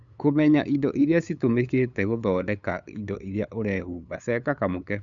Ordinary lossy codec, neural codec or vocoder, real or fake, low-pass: none; codec, 16 kHz, 8 kbps, FunCodec, trained on LibriTTS, 25 frames a second; fake; 7.2 kHz